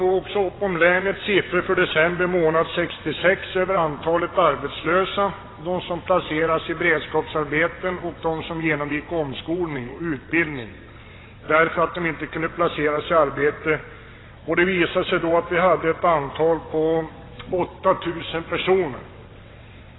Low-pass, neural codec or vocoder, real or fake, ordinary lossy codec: 7.2 kHz; vocoder, 22.05 kHz, 80 mel bands, WaveNeXt; fake; AAC, 16 kbps